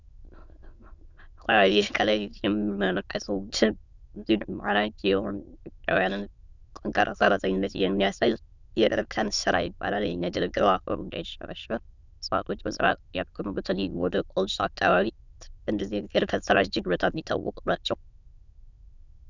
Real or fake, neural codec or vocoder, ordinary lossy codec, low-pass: fake; autoencoder, 22.05 kHz, a latent of 192 numbers a frame, VITS, trained on many speakers; Opus, 64 kbps; 7.2 kHz